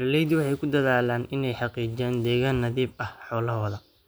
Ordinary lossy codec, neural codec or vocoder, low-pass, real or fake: none; none; none; real